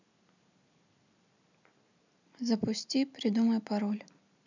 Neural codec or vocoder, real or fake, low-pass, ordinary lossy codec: none; real; 7.2 kHz; none